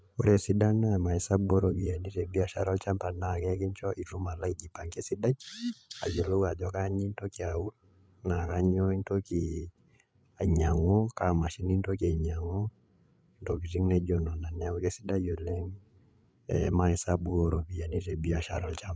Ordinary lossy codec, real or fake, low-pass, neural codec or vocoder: none; fake; none; codec, 16 kHz, 8 kbps, FreqCodec, larger model